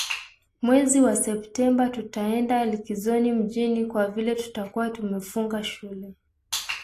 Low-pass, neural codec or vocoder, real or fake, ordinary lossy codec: 14.4 kHz; none; real; AAC, 48 kbps